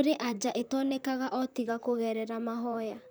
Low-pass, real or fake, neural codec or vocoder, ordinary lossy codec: none; fake; vocoder, 44.1 kHz, 128 mel bands, Pupu-Vocoder; none